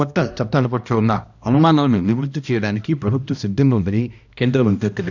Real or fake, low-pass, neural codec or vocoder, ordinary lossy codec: fake; 7.2 kHz; codec, 16 kHz, 1 kbps, X-Codec, HuBERT features, trained on balanced general audio; none